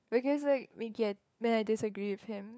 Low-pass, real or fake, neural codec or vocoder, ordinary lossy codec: none; fake; codec, 16 kHz, 4 kbps, FunCodec, trained on LibriTTS, 50 frames a second; none